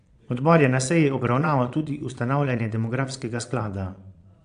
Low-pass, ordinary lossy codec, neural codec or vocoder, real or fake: 9.9 kHz; AAC, 64 kbps; vocoder, 22.05 kHz, 80 mel bands, Vocos; fake